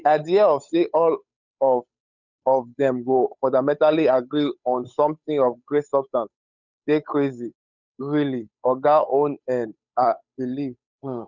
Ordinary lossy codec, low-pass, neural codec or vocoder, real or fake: none; 7.2 kHz; codec, 16 kHz, 8 kbps, FunCodec, trained on Chinese and English, 25 frames a second; fake